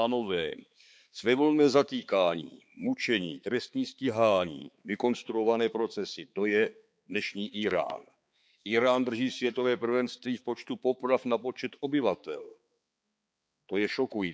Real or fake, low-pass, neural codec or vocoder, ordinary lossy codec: fake; none; codec, 16 kHz, 4 kbps, X-Codec, HuBERT features, trained on balanced general audio; none